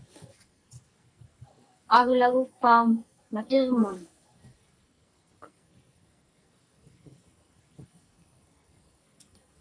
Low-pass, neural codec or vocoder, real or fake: 9.9 kHz; codec, 44.1 kHz, 2.6 kbps, SNAC; fake